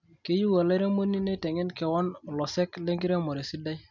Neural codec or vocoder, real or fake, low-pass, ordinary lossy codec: none; real; 7.2 kHz; none